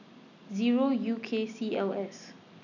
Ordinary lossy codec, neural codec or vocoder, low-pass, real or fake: none; none; 7.2 kHz; real